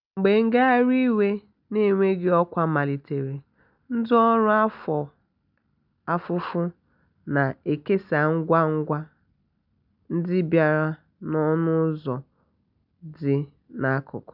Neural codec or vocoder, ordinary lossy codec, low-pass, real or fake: none; none; 5.4 kHz; real